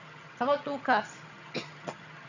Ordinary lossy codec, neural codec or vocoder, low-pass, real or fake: none; vocoder, 22.05 kHz, 80 mel bands, HiFi-GAN; 7.2 kHz; fake